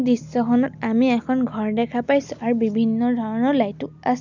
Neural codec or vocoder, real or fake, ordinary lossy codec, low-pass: none; real; none; 7.2 kHz